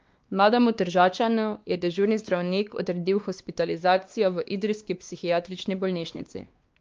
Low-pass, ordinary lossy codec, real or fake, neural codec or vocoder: 7.2 kHz; Opus, 24 kbps; fake; codec, 16 kHz, 2 kbps, X-Codec, WavLM features, trained on Multilingual LibriSpeech